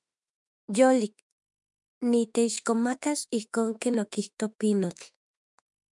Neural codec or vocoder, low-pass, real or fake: autoencoder, 48 kHz, 32 numbers a frame, DAC-VAE, trained on Japanese speech; 10.8 kHz; fake